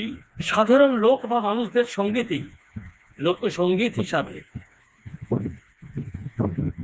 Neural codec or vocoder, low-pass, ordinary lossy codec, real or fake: codec, 16 kHz, 2 kbps, FreqCodec, smaller model; none; none; fake